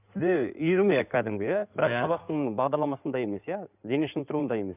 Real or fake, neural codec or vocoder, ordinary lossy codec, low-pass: fake; codec, 16 kHz in and 24 kHz out, 2.2 kbps, FireRedTTS-2 codec; none; 3.6 kHz